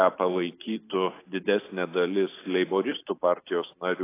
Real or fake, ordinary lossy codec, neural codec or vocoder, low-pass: fake; AAC, 24 kbps; vocoder, 44.1 kHz, 128 mel bands every 512 samples, BigVGAN v2; 3.6 kHz